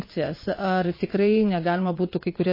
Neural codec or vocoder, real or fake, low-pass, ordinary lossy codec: vocoder, 22.05 kHz, 80 mel bands, Vocos; fake; 5.4 kHz; MP3, 24 kbps